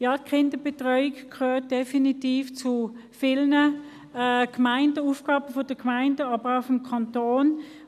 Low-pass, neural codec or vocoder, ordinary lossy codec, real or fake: 14.4 kHz; none; none; real